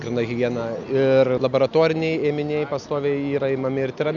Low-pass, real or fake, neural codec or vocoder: 7.2 kHz; real; none